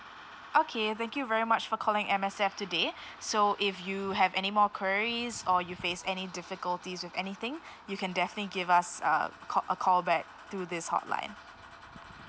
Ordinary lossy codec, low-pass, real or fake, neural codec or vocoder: none; none; real; none